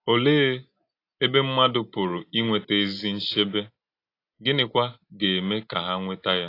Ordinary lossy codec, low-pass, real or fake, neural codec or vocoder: AAC, 32 kbps; 5.4 kHz; real; none